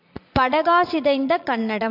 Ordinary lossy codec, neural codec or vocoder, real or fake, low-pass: MP3, 48 kbps; vocoder, 44.1 kHz, 80 mel bands, Vocos; fake; 5.4 kHz